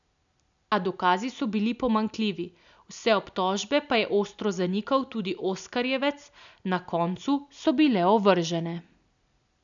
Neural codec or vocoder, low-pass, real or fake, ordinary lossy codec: none; 7.2 kHz; real; none